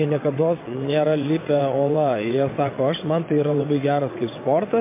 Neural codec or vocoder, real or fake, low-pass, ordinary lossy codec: vocoder, 22.05 kHz, 80 mel bands, WaveNeXt; fake; 3.6 kHz; MP3, 24 kbps